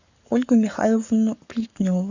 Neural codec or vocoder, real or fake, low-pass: codec, 44.1 kHz, 7.8 kbps, Pupu-Codec; fake; 7.2 kHz